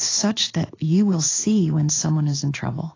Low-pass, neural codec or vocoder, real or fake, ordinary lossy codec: 7.2 kHz; codec, 16 kHz in and 24 kHz out, 1 kbps, XY-Tokenizer; fake; AAC, 32 kbps